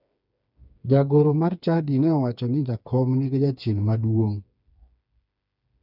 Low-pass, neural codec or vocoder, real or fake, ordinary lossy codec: 5.4 kHz; codec, 16 kHz, 4 kbps, FreqCodec, smaller model; fake; none